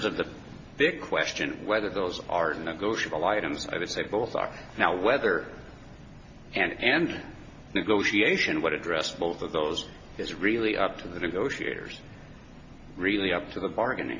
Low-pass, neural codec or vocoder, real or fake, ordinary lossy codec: 7.2 kHz; none; real; MP3, 48 kbps